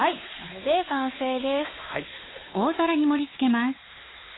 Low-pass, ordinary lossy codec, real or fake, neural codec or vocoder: 7.2 kHz; AAC, 16 kbps; fake; codec, 16 kHz, 4 kbps, X-Codec, WavLM features, trained on Multilingual LibriSpeech